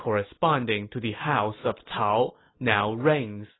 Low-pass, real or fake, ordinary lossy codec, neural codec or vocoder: 7.2 kHz; real; AAC, 16 kbps; none